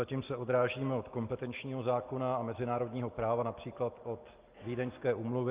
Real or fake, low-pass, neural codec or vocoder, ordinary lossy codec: real; 3.6 kHz; none; Opus, 32 kbps